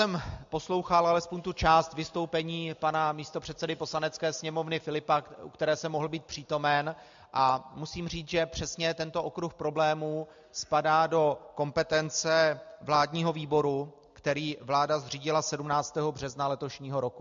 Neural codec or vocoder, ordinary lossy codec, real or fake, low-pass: none; MP3, 64 kbps; real; 7.2 kHz